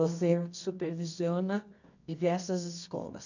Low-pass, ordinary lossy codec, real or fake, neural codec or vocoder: 7.2 kHz; none; fake; codec, 24 kHz, 0.9 kbps, WavTokenizer, medium music audio release